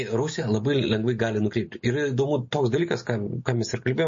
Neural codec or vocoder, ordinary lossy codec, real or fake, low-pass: none; MP3, 32 kbps; real; 7.2 kHz